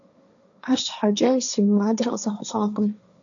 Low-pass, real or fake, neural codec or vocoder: 7.2 kHz; fake; codec, 16 kHz, 1.1 kbps, Voila-Tokenizer